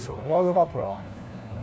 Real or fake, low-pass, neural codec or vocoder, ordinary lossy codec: fake; none; codec, 16 kHz, 1 kbps, FunCodec, trained on LibriTTS, 50 frames a second; none